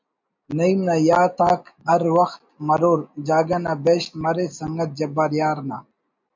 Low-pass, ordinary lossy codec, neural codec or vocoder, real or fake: 7.2 kHz; MP3, 64 kbps; none; real